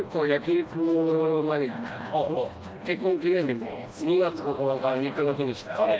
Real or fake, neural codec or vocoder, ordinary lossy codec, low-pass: fake; codec, 16 kHz, 1 kbps, FreqCodec, smaller model; none; none